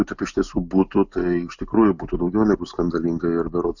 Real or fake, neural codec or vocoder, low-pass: real; none; 7.2 kHz